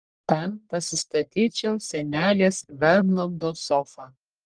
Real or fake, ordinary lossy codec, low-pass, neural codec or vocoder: fake; Opus, 24 kbps; 9.9 kHz; codec, 44.1 kHz, 1.7 kbps, Pupu-Codec